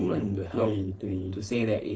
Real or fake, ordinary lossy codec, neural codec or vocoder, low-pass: fake; none; codec, 16 kHz, 4.8 kbps, FACodec; none